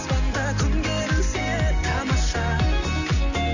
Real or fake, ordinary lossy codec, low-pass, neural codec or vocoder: real; none; 7.2 kHz; none